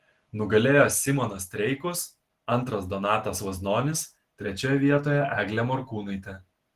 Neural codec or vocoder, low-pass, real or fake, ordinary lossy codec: none; 14.4 kHz; real; Opus, 16 kbps